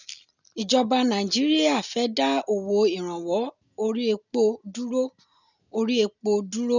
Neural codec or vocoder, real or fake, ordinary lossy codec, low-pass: none; real; none; 7.2 kHz